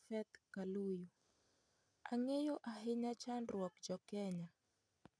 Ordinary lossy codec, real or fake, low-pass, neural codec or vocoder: none; real; 9.9 kHz; none